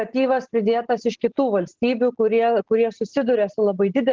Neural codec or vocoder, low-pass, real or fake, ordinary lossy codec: none; 7.2 kHz; real; Opus, 16 kbps